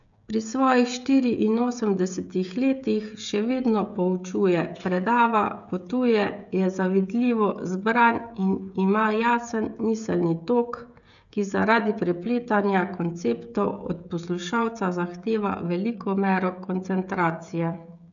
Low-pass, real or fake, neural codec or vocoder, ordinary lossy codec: 7.2 kHz; fake; codec, 16 kHz, 16 kbps, FreqCodec, smaller model; none